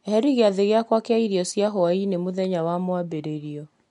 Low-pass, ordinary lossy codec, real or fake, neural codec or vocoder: 10.8 kHz; MP3, 64 kbps; real; none